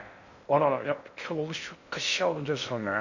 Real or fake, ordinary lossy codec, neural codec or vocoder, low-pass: fake; none; codec, 16 kHz in and 24 kHz out, 0.6 kbps, FocalCodec, streaming, 2048 codes; 7.2 kHz